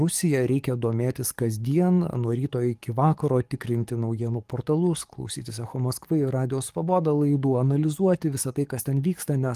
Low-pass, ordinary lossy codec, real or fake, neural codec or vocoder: 14.4 kHz; Opus, 24 kbps; fake; codec, 44.1 kHz, 7.8 kbps, DAC